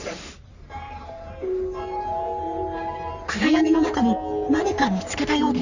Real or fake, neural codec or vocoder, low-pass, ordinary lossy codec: fake; codec, 44.1 kHz, 3.4 kbps, Pupu-Codec; 7.2 kHz; none